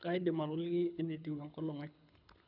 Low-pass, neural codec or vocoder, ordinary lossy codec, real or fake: 5.4 kHz; codec, 24 kHz, 6 kbps, HILCodec; none; fake